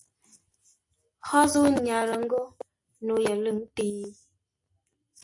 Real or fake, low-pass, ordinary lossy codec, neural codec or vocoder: real; 10.8 kHz; AAC, 64 kbps; none